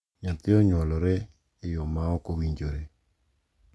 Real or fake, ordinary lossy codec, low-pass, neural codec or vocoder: real; none; none; none